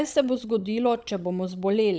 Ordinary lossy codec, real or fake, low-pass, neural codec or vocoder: none; fake; none; codec, 16 kHz, 16 kbps, FunCodec, trained on Chinese and English, 50 frames a second